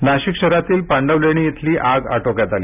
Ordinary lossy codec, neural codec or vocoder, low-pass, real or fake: none; none; 3.6 kHz; real